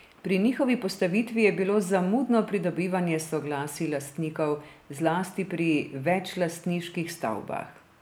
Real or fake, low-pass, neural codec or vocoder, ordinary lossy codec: real; none; none; none